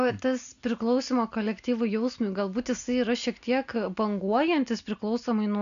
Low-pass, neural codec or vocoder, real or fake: 7.2 kHz; none; real